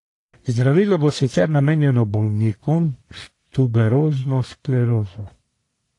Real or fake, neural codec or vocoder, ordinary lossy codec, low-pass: fake; codec, 44.1 kHz, 1.7 kbps, Pupu-Codec; AAC, 48 kbps; 10.8 kHz